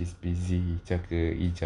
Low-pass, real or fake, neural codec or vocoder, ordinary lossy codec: 10.8 kHz; real; none; none